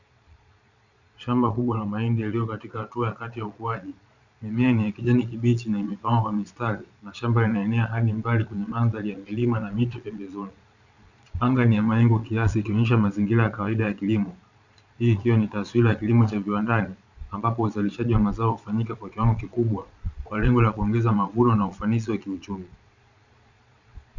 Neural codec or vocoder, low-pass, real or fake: vocoder, 22.05 kHz, 80 mel bands, Vocos; 7.2 kHz; fake